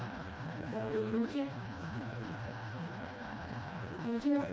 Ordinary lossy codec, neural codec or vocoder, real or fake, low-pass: none; codec, 16 kHz, 1 kbps, FreqCodec, smaller model; fake; none